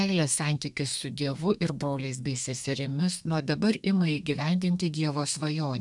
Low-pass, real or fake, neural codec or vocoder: 10.8 kHz; fake; codec, 32 kHz, 1.9 kbps, SNAC